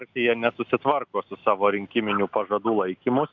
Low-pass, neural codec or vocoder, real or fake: 7.2 kHz; none; real